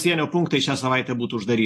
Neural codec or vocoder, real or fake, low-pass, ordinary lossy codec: none; real; 14.4 kHz; AAC, 48 kbps